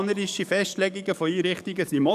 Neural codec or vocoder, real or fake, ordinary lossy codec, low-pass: codec, 44.1 kHz, 7.8 kbps, DAC; fake; none; 14.4 kHz